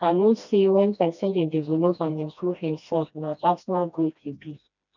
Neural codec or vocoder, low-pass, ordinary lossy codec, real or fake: codec, 16 kHz, 1 kbps, FreqCodec, smaller model; 7.2 kHz; none; fake